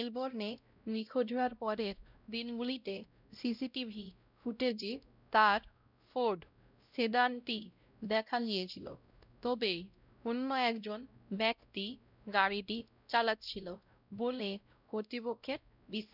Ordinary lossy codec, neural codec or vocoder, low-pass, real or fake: none; codec, 16 kHz, 0.5 kbps, X-Codec, WavLM features, trained on Multilingual LibriSpeech; 5.4 kHz; fake